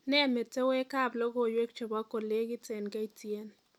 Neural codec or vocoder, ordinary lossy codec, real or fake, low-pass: none; none; real; 19.8 kHz